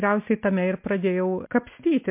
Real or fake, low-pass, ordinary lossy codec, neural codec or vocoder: real; 3.6 kHz; MP3, 32 kbps; none